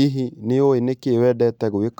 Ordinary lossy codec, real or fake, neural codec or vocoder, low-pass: none; real; none; 19.8 kHz